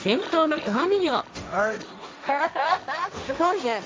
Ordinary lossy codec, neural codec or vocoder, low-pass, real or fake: none; codec, 16 kHz, 1.1 kbps, Voila-Tokenizer; none; fake